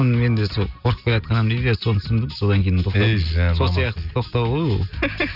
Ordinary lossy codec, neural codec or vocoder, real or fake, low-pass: none; none; real; 5.4 kHz